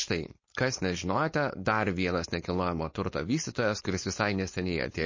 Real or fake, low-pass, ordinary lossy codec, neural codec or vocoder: fake; 7.2 kHz; MP3, 32 kbps; codec, 16 kHz, 4.8 kbps, FACodec